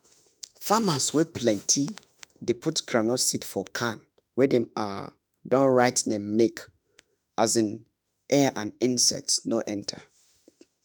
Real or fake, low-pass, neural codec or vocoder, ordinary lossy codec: fake; none; autoencoder, 48 kHz, 32 numbers a frame, DAC-VAE, trained on Japanese speech; none